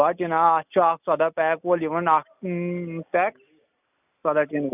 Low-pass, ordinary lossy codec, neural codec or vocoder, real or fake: 3.6 kHz; none; none; real